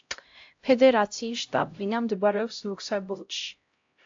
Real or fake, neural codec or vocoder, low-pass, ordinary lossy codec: fake; codec, 16 kHz, 0.5 kbps, X-Codec, HuBERT features, trained on LibriSpeech; 7.2 kHz; AAC, 48 kbps